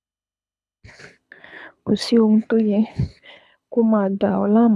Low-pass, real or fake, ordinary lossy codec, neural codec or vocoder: none; fake; none; codec, 24 kHz, 6 kbps, HILCodec